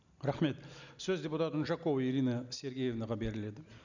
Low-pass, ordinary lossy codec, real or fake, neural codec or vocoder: 7.2 kHz; none; real; none